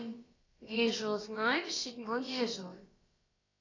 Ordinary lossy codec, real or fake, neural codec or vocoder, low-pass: AAC, 32 kbps; fake; codec, 16 kHz, about 1 kbps, DyCAST, with the encoder's durations; 7.2 kHz